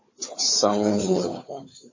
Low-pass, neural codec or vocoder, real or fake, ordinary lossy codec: 7.2 kHz; codec, 16 kHz, 4 kbps, FunCodec, trained on Chinese and English, 50 frames a second; fake; MP3, 32 kbps